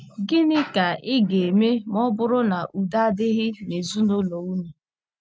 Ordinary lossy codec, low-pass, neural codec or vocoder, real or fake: none; none; none; real